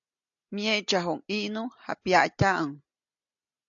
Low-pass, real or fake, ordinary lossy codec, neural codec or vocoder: 7.2 kHz; real; AAC, 64 kbps; none